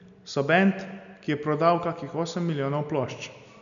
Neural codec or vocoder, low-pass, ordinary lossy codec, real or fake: none; 7.2 kHz; none; real